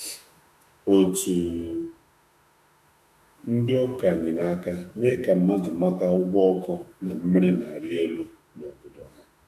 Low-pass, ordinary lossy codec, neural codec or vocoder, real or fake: 14.4 kHz; none; autoencoder, 48 kHz, 32 numbers a frame, DAC-VAE, trained on Japanese speech; fake